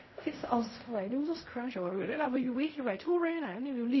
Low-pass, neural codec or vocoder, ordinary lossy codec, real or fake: 7.2 kHz; codec, 16 kHz in and 24 kHz out, 0.4 kbps, LongCat-Audio-Codec, fine tuned four codebook decoder; MP3, 24 kbps; fake